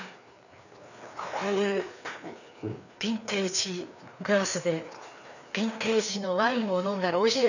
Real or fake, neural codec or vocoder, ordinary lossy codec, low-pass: fake; codec, 16 kHz, 2 kbps, FreqCodec, larger model; none; 7.2 kHz